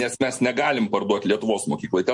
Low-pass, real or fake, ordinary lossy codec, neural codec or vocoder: 10.8 kHz; real; MP3, 48 kbps; none